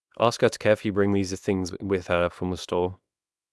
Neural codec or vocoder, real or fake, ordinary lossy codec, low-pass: codec, 24 kHz, 0.9 kbps, WavTokenizer, small release; fake; none; none